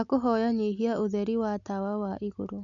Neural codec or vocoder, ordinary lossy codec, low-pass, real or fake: none; none; 7.2 kHz; real